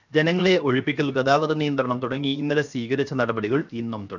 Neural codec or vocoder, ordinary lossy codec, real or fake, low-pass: codec, 16 kHz, 0.7 kbps, FocalCodec; none; fake; 7.2 kHz